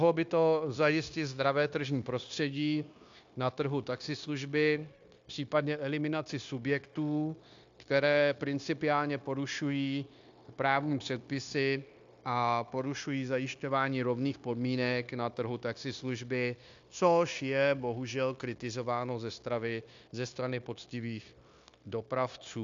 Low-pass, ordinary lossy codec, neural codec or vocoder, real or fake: 7.2 kHz; AAC, 64 kbps; codec, 16 kHz, 0.9 kbps, LongCat-Audio-Codec; fake